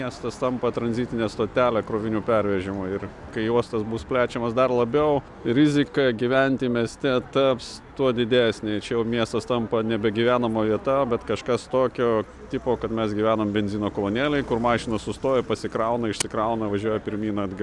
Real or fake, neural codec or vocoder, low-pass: real; none; 10.8 kHz